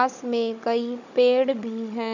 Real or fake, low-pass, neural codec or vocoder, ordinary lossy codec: fake; 7.2 kHz; codec, 16 kHz, 16 kbps, FunCodec, trained on Chinese and English, 50 frames a second; none